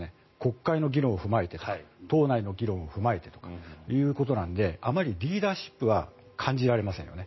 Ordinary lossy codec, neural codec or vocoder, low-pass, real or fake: MP3, 24 kbps; none; 7.2 kHz; real